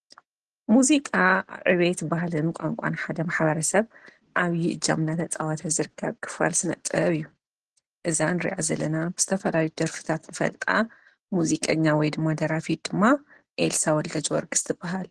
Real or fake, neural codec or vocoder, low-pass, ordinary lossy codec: real; none; 10.8 kHz; Opus, 16 kbps